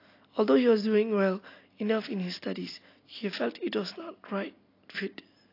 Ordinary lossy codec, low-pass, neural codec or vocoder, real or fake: AAC, 32 kbps; 5.4 kHz; none; real